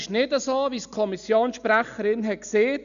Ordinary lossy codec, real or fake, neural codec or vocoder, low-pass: none; real; none; 7.2 kHz